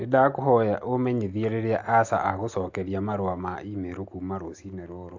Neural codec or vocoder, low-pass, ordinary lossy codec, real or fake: none; 7.2 kHz; AAC, 48 kbps; real